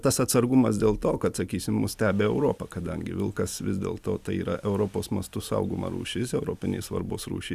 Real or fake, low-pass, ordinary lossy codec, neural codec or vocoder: real; 14.4 kHz; Opus, 64 kbps; none